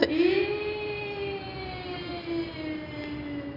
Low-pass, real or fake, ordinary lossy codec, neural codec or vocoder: 5.4 kHz; real; none; none